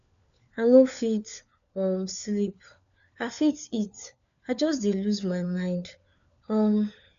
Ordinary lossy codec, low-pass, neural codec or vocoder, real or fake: none; 7.2 kHz; codec, 16 kHz, 4 kbps, FunCodec, trained on LibriTTS, 50 frames a second; fake